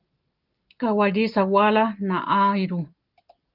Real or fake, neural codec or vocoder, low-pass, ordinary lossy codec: real; none; 5.4 kHz; Opus, 32 kbps